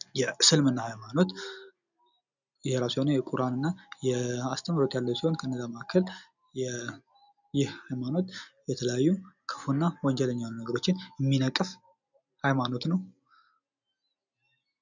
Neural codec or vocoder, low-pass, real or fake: none; 7.2 kHz; real